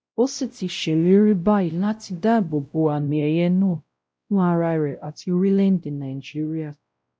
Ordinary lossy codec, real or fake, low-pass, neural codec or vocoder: none; fake; none; codec, 16 kHz, 0.5 kbps, X-Codec, WavLM features, trained on Multilingual LibriSpeech